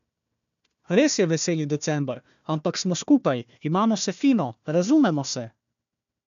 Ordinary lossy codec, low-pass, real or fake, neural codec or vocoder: none; 7.2 kHz; fake; codec, 16 kHz, 1 kbps, FunCodec, trained on Chinese and English, 50 frames a second